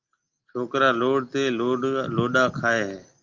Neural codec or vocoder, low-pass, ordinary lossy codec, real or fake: none; 7.2 kHz; Opus, 32 kbps; real